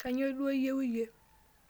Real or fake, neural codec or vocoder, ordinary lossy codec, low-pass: real; none; none; none